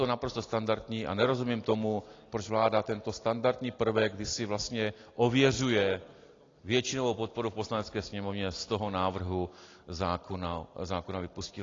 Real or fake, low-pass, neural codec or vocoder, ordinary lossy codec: real; 7.2 kHz; none; AAC, 32 kbps